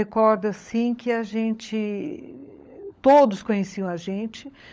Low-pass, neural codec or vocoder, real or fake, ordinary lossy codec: none; codec, 16 kHz, 16 kbps, FunCodec, trained on LibriTTS, 50 frames a second; fake; none